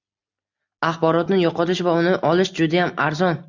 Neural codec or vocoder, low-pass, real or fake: none; 7.2 kHz; real